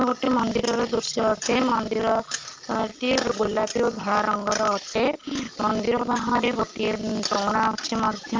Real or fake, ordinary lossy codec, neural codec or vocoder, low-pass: real; Opus, 32 kbps; none; 7.2 kHz